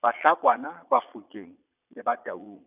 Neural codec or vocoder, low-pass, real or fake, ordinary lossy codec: vocoder, 22.05 kHz, 80 mel bands, Vocos; 3.6 kHz; fake; none